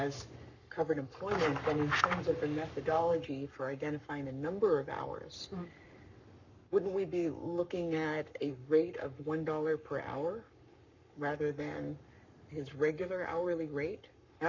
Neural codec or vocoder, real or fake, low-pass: codec, 44.1 kHz, 7.8 kbps, Pupu-Codec; fake; 7.2 kHz